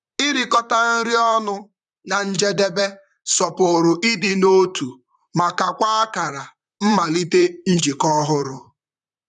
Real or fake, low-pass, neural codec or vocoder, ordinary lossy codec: fake; 10.8 kHz; vocoder, 44.1 kHz, 128 mel bands, Pupu-Vocoder; none